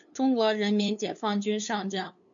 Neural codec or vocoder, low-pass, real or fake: codec, 16 kHz, 2 kbps, FunCodec, trained on LibriTTS, 25 frames a second; 7.2 kHz; fake